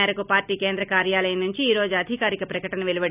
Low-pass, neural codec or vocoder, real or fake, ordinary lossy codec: 3.6 kHz; none; real; none